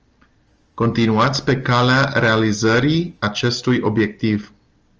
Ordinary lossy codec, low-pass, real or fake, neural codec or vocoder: Opus, 24 kbps; 7.2 kHz; real; none